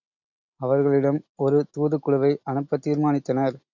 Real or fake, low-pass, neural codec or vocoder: real; 7.2 kHz; none